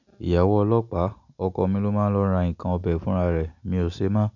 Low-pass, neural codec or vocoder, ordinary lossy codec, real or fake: 7.2 kHz; none; none; real